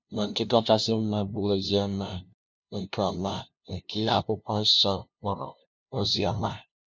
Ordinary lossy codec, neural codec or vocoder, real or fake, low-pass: none; codec, 16 kHz, 0.5 kbps, FunCodec, trained on LibriTTS, 25 frames a second; fake; none